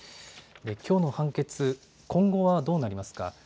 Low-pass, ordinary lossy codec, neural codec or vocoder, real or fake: none; none; none; real